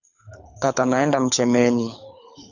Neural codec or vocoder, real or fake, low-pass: codec, 24 kHz, 6 kbps, HILCodec; fake; 7.2 kHz